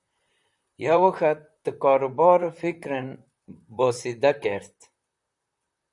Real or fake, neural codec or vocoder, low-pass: fake; vocoder, 44.1 kHz, 128 mel bands, Pupu-Vocoder; 10.8 kHz